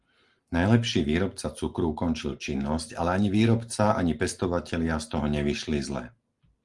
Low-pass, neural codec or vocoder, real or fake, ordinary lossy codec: 10.8 kHz; none; real; Opus, 24 kbps